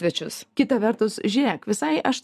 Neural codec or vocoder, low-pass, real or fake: vocoder, 44.1 kHz, 128 mel bands every 256 samples, BigVGAN v2; 14.4 kHz; fake